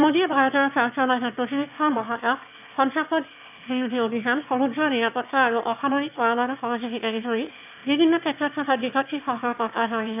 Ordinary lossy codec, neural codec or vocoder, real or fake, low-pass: none; autoencoder, 22.05 kHz, a latent of 192 numbers a frame, VITS, trained on one speaker; fake; 3.6 kHz